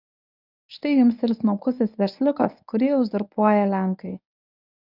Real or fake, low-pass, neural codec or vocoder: fake; 5.4 kHz; codec, 24 kHz, 0.9 kbps, WavTokenizer, medium speech release version 1